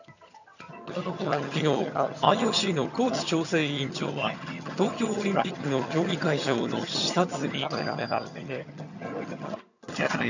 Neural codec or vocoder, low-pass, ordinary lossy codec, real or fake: vocoder, 22.05 kHz, 80 mel bands, HiFi-GAN; 7.2 kHz; none; fake